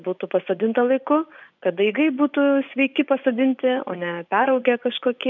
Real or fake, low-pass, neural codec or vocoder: fake; 7.2 kHz; vocoder, 24 kHz, 100 mel bands, Vocos